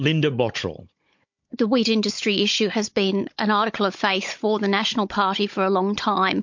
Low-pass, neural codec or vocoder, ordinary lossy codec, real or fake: 7.2 kHz; codec, 16 kHz, 16 kbps, FunCodec, trained on Chinese and English, 50 frames a second; MP3, 48 kbps; fake